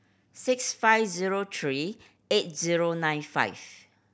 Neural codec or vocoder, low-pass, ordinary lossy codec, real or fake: none; none; none; real